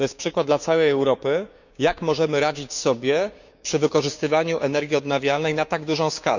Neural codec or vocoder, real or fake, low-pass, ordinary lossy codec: codec, 16 kHz, 6 kbps, DAC; fake; 7.2 kHz; none